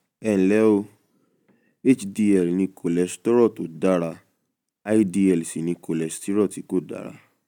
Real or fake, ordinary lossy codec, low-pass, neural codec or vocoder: real; none; 19.8 kHz; none